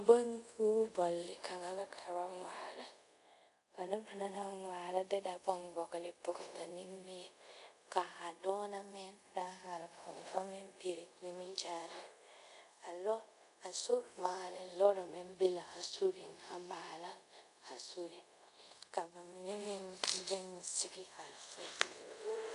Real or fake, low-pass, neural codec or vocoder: fake; 10.8 kHz; codec, 24 kHz, 0.5 kbps, DualCodec